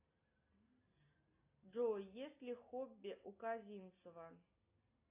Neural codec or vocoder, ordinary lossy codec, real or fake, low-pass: none; AAC, 32 kbps; real; 3.6 kHz